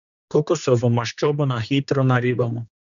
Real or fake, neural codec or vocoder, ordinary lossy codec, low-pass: fake; codec, 16 kHz, 2 kbps, X-Codec, HuBERT features, trained on general audio; none; 7.2 kHz